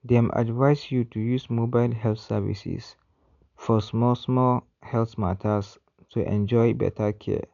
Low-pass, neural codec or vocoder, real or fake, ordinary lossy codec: 7.2 kHz; none; real; none